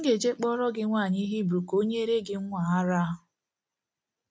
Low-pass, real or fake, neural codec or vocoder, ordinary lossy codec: none; real; none; none